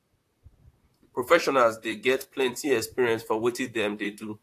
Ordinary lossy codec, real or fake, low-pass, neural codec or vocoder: AAC, 64 kbps; fake; 14.4 kHz; vocoder, 44.1 kHz, 128 mel bands, Pupu-Vocoder